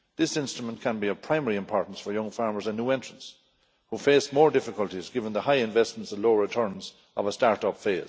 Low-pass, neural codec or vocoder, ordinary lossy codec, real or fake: none; none; none; real